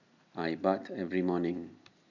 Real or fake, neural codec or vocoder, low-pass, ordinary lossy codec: real; none; 7.2 kHz; none